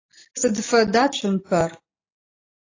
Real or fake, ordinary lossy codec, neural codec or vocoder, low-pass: real; AAC, 32 kbps; none; 7.2 kHz